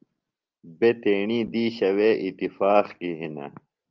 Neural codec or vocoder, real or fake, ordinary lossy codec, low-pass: none; real; Opus, 24 kbps; 7.2 kHz